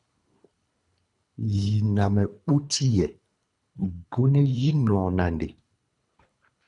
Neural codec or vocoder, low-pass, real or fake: codec, 24 kHz, 3 kbps, HILCodec; 10.8 kHz; fake